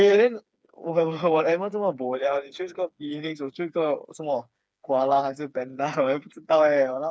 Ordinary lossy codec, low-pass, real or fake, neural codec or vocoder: none; none; fake; codec, 16 kHz, 4 kbps, FreqCodec, smaller model